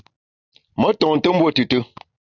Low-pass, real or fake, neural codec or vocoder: 7.2 kHz; real; none